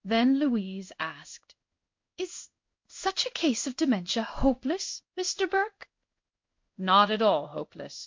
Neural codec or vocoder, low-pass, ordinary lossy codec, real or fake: codec, 16 kHz in and 24 kHz out, 1 kbps, XY-Tokenizer; 7.2 kHz; MP3, 64 kbps; fake